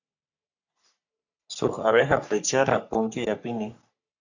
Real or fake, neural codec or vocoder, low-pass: fake; codec, 44.1 kHz, 3.4 kbps, Pupu-Codec; 7.2 kHz